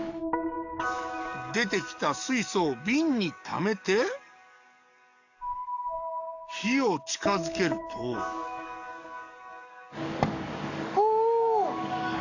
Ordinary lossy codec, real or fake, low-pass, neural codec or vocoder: none; fake; 7.2 kHz; codec, 44.1 kHz, 7.8 kbps, DAC